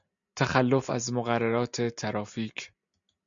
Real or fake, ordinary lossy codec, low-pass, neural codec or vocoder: real; MP3, 64 kbps; 7.2 kHz; none